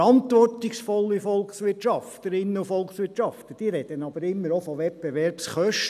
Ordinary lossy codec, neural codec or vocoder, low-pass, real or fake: none; none; 14.4 kHz; real